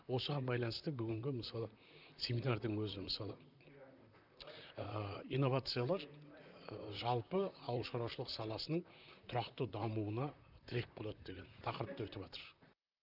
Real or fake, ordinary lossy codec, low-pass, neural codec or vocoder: fake; none; 5.4 kHz; vocoder, 44.1 kHz, 128 mel bands, Pupu-Vocoder